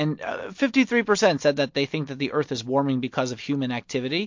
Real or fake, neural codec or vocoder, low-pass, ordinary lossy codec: real; none; 7.2 kHz; MP3, 48 kbps